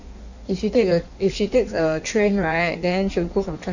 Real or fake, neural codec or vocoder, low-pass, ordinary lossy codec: fake; codec, 16 kHz in and 24 kHz out, 1.1 kbps, FireRedTTS-2 codec; 7.2 kHz; none